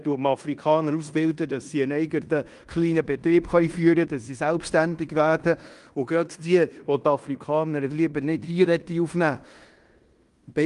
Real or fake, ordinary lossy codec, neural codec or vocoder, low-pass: fake; Opus, 32 kbps; codec, 16 kHz in and 24 kHz out, 0.9 kbps, LongCat-Audio-Codec, fine tuned four codebook decoder; 10.8 kHz